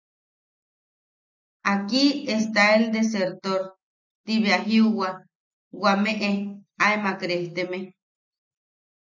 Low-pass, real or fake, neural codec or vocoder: 7.2 kHz; real; none